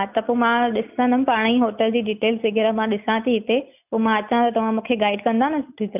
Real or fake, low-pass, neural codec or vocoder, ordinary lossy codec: real; 3.6 kHz; none; none